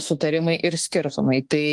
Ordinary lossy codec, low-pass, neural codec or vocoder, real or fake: Opus, 24 kbps; 10.8 kHz; autoencoder, 48 kHz, 32 numbers a frame, DAC-VAE, trained on Japanese speech; fake